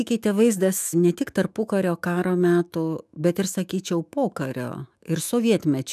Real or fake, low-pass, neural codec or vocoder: fake; 14.4 kHz; autoencoder, 48 kHz, 128 numbers a frame, DAC-VAE, trained on Japanese speech